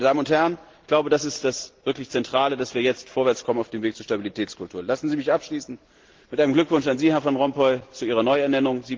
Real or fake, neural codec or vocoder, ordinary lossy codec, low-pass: real; none; Opus, 16 kbps; 7.2 kHz